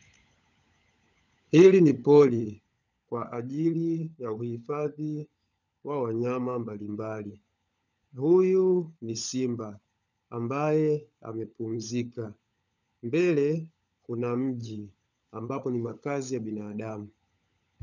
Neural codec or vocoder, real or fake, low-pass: codec, 16 kHz, 16 kbps, FunCodec, trained on LibriTTS, 50 frames a second; fake; 7.2 kHz